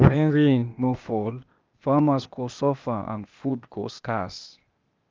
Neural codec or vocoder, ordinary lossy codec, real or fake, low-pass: codec, 16 kHz, 0.8 kbps, ZipCodec; Opus, 24 kbps; fake; 7.2 kHz